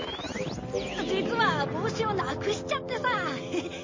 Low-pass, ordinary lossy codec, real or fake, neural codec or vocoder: 7.2 kHz; MP3, 32 kbps; real; none